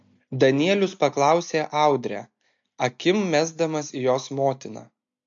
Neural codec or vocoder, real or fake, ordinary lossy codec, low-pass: none; real; MP3, 48 kbps; 7.2 kHz